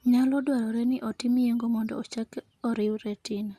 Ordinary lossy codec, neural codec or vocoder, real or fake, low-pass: Opus, 64 kbps; none; real; 14.4 kHz